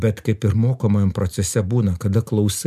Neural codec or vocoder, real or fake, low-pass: none; real; 14.4 kHz